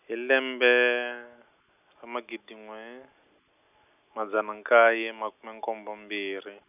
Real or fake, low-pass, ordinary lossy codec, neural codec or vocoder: real; 3.6 kHz; none; none